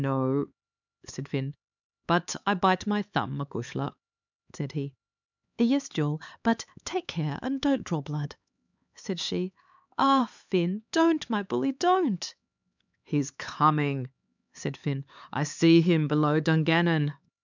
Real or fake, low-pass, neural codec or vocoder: fake; 7.2 kHz; codec, 16 kHz, 4 kbps, X-Codec, HuBERT features, trained on LibriSpeech